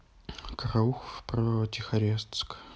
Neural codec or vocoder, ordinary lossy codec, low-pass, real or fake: none; none; none; real